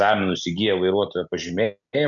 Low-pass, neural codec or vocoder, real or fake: 7.2 kHz; none; real